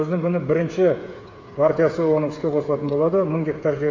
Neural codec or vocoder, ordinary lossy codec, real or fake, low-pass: codec, 16 kHz, 8 kbps, FreqCodec, smaller model; AAC, 48 kbps; fake; 7.2 kHz